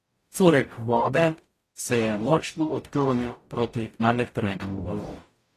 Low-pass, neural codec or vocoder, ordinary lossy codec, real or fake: 14.4 kHz; codec, 44.1 kHz, 0.9 kbps, DAC; AAC, 48 kbps; fake